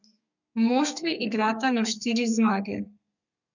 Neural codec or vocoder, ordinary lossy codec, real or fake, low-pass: codec, 32 kHz, 1.9 kbps, SNAC; none; fake; 7.2 kHz